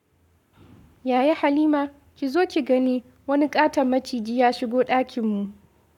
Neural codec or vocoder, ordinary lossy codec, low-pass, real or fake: codec, 44.1 kHz, 7.8 kbps, Pupu-Codec; none; 19.8 kHz; fake